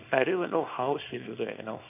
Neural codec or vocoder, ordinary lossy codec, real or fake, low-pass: codec, 24 kHz, 0.9 kbps, WavTokenizer, small release; none; fake; 3.6 kHz